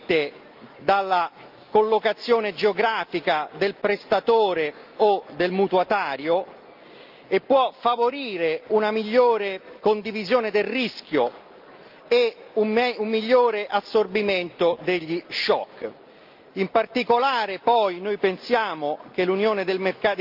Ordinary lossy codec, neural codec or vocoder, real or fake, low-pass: Opus, 24 kbps; none; real; 5.4 kHz